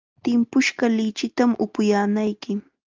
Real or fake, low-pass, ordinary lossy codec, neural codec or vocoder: real; 7.2 kHz; Opus, 32 kbps; none